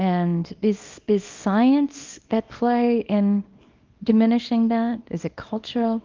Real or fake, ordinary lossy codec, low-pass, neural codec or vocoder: fake; Opus, 24 kbps; 7.2 kHz; codec, 24 kHz, 0.9 kbps, WavTokenizer, small release